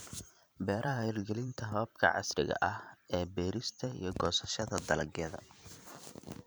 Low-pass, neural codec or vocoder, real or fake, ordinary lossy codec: none; vocoder, 44.1 kHz, 128 mel bands every 512 samples, BigVGAN v2; fake; none